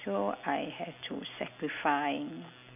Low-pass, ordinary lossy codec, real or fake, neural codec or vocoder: 3.6 kHz; none; real; none